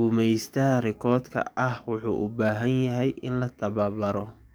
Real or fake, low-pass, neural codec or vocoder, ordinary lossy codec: fake; none; codec, 44.1 kHz, 7.8 kbps, DAC; none